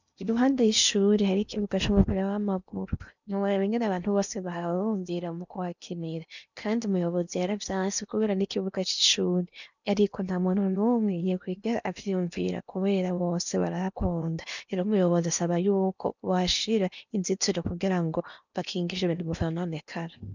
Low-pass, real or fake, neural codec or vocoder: 7.2 kHz; fake; codec, 16 kHz in and 24 kHz out, 0.8 kbps, FocalCodec, streaming, 65536 codes